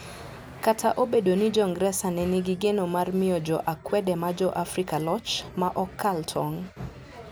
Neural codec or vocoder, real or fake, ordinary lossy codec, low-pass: none; real; none; none